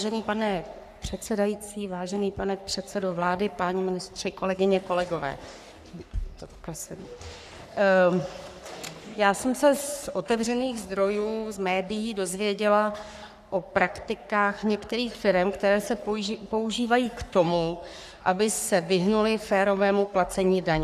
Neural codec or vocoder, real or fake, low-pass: codec, 44.1 kHz, 3.4 kbps, Pupu-Codec; fake; 14.4 kHz